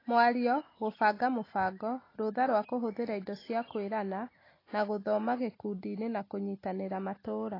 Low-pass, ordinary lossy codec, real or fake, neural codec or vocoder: 5.4 kHz; AAC, 24 kbps; real; none